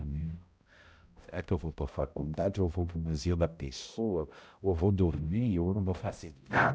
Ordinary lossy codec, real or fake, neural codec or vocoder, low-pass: none; fake; codec, 16 kHz, 0.5 kbps, X-Codec, HuBERT features, trained on balanced general audio; none